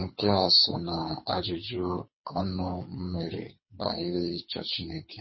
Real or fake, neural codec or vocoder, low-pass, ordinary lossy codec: fake; codec, 24 kHz, 3 kbps, HILCodec; 7.2 kHz; MP3, 24 kbps